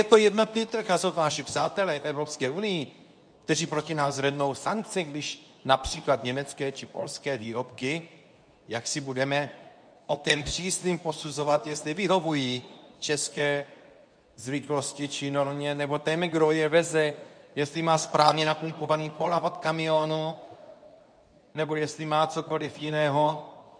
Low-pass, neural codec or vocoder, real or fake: 9.9 kHz; codec, 24 kHz, 0.9 kbps, WavTokenizer, medium speech release version 2; fake